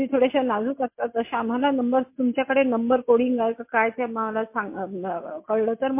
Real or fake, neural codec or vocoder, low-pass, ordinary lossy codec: real; none; 3.6 kHz; MP3, 24 kbps